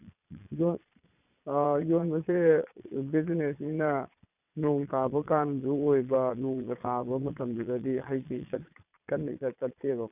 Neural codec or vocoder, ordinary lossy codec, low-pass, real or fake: vocoder, 22.05 kHz, 80 mel bands, Vocos; none; 3.6 kHz; fake